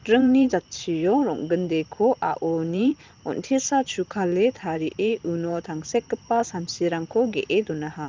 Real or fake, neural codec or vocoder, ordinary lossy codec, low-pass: fake; vocoder, 44.1 kHz, 128 mel bands every 512 samples, BigVGAN v2; Opus, 32 kbps; 7.2 kHz